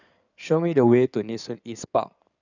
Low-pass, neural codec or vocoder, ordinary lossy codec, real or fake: 7.2 kHz; codec, 16 kHz in and 24 kHz out, 2.2 kbps, FireRedTTS-2 codec; none; fake